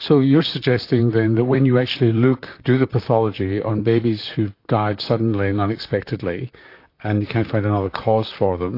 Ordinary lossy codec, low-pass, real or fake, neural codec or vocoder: AAC, 32 kbps; 5.4 kHz; fake; vocoder, 44.1 kHz, 128 mel bands, Pupu-Vocoder